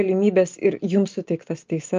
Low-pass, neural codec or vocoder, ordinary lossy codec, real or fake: 7.2 kHz; none; Opus, 24 kbps; real